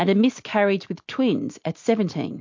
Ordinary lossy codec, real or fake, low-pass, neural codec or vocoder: MP3, 48 kbps; real; 7.2 kHz; none